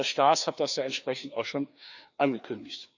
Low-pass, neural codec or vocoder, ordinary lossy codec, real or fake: 7.2 kHz; codec, 16 kHz, 2 kbps, FreqCodec, larger model; none; fake